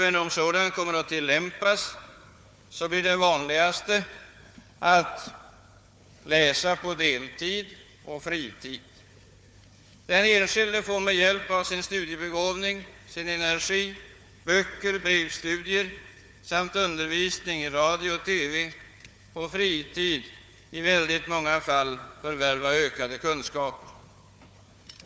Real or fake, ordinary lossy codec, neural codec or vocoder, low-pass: fake; none; codec, 16 kHz, 4 kbps, FunCodec, trained on Chinese and English, 50 frames a second; none